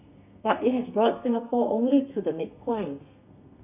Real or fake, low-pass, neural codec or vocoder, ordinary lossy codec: fake; 3.6 kHz; codec, 32 kHz, 1.9 kbps, SNAC; none